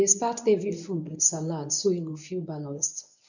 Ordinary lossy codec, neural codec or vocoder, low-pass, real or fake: none; codec, 24 kHz, 0.9 kbps, WavTokenizer, medium speech release version 1; 7.2 kHz; fake